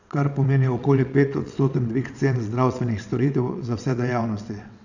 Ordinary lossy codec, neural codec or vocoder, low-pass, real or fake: none; vocoder, 22.05 kHz, 80 mel bands, WaveNeXt; 7.2 kHz; fake